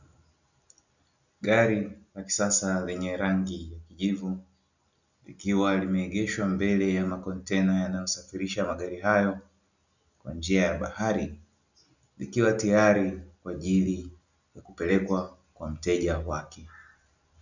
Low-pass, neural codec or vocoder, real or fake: 7.2 kHz; none; real